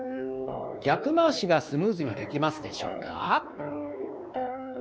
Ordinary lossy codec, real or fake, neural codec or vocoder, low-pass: none; fake; codec, 16 kHz, 2 kbps, X-Codec, WavLM features, trained on Multilingual LibriSpeech; none